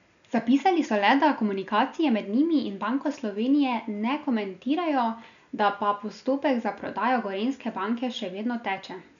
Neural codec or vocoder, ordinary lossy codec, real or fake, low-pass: none; none; real; 7.2 kHz